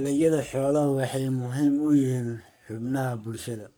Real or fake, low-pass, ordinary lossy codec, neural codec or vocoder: fake; none; none; codec, 44.1 kHz, 3.4 kbps, Pupu-Codec